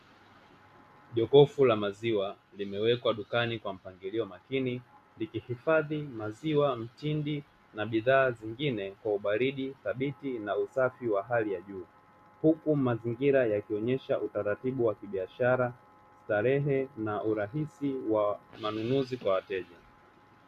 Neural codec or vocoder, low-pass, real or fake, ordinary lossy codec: none; 14.4 kHz; real; AAC, 96 kbps